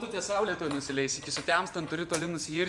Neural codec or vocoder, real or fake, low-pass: vocoder, 24 kHz, 100 mel bands, Vocos; fake; 10.8 kHz